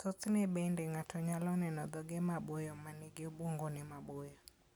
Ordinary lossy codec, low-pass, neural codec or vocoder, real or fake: none; none; none; real